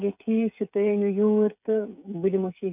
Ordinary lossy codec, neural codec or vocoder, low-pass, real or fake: none; codec, 16 kHz, 16 kbps, FreqCodec, smaller model; 3.6 kHz; fake